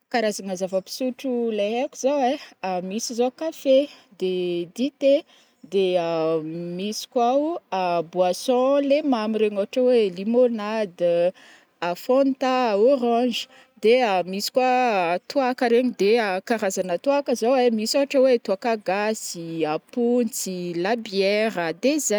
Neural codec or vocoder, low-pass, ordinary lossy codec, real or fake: none; none; none; real